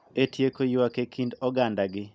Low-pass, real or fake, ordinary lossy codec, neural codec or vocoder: none; real; none; none